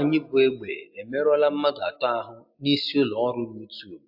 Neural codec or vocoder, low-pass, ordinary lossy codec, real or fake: none; 5.4 kHz; AAC, 48 kbps; real